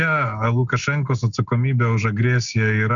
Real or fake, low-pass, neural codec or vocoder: real; 7.2 kHz; none